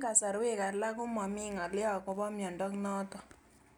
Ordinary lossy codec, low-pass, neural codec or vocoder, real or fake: none; none; none; real